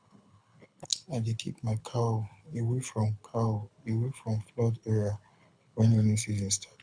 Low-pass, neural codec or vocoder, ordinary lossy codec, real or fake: 9.9 kHz; codec, 24 kHz, 6 kbps, HILCodec; none; fake